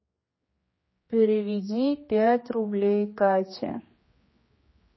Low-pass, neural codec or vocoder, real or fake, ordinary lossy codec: 7.2 kHz; codec, 16 kHz, 2 kbps, X-Codec, HuBERT features, trained on general audio; fake; MP3, 24 kbps